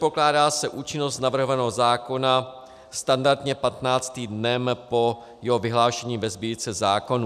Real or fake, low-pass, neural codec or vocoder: real; 14.4 kHz; none